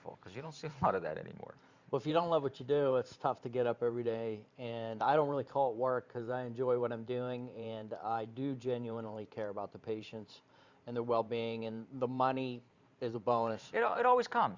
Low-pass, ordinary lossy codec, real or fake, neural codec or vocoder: 7.2 kHz; Opus, 64 kbps; fake; vocoder, 44.1 kHz, 128 mel bands every 512 samples, BigVGAN v2